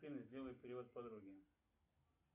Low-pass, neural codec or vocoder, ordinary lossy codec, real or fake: 3.6 kHz; none; MP3, 32 kbps; real